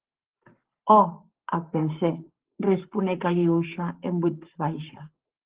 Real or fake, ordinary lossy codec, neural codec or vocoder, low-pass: fake; Opus, 16 kbps; codec, 44.1 kHz, 7.8 kbps, Pupu-Codec; 3.6 kHz